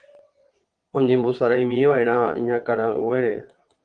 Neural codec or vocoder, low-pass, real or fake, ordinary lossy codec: vocoder, 22.05 kHz, 80 mel bands, WaveNeXt; 9.9 kHz; fake; Opus, 24 kbps